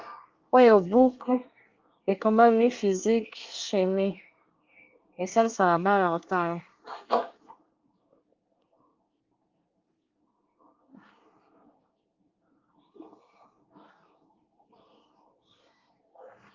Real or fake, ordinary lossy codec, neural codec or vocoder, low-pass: fake; Opus, 24 kbps; codec, 24 kHz, 1 kbps, SNAC; 7.2 kHz